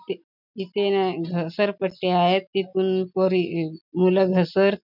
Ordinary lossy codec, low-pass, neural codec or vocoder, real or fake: none; 5.4 kHz; none; real